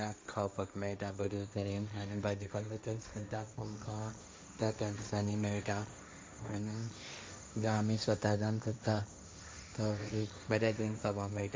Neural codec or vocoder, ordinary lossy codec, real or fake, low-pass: codec, 16 kHz, 1.1 kbps, Voila-Tokenizer; none; fake; none